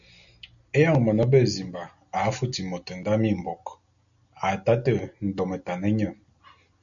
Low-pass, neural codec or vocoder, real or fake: 7.2 kHz; none; real